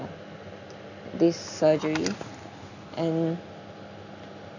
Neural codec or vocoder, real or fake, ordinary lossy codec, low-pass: none; real; none; 7.2 kHz